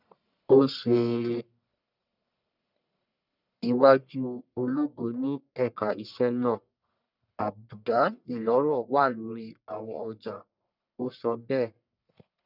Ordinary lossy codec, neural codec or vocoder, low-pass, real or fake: none; codec, 44.1 kHz, 1.7 kbps, Pupu-Codec; 5.4 kHz; fake